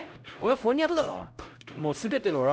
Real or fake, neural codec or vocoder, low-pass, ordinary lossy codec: fake; codec, 16 kHz, 0.5 kbps, X-Codec, HuBERT features, trained on LibriSpeech; none; none